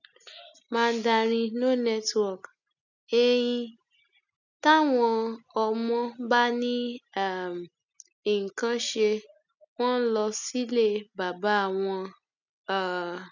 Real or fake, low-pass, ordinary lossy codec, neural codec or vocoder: real; 7.2 kHz; none; none